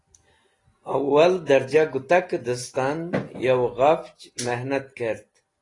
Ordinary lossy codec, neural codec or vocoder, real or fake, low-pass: AAC, 32 kbps; none; real; 10.8 kHz